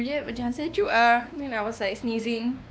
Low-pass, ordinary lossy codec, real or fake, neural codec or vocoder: none; none; fake; codec, 16 kHz, 2 kbps, X-Codec, WavLM features, trained on Multilingual LibriSpeech